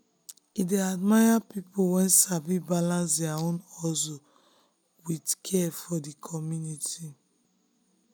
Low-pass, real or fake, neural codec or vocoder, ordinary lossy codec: none; real; none; none